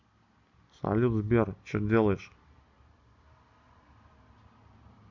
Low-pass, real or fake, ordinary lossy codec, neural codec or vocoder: 7.2 kHz; real; none; none